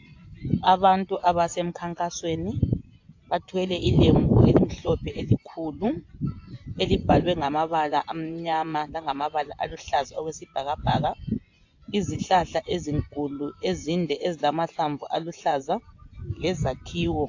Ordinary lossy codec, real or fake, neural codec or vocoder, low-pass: AAC, 48 kbps; fake; vocoder, 24 kHz, 100 mel bands, Vocos; 7.2 kHz